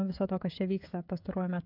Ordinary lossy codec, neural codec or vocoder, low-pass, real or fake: AAC, 48 kbps; codec, 16 kHz, 16 kbps, FreqCodec, smaller model; 5.4 kHz; fake